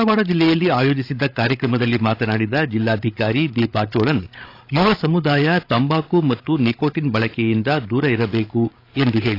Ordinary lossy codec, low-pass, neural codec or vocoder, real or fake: AAC, 32 kbps; 5.4 kHz; codec, 16 kHz, 8 kbps, FreqCodec, larger model; fake